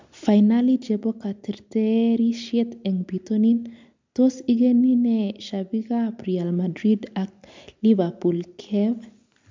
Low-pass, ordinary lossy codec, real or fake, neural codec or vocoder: 7.2 kHz; MP3, 64 kbps; real; none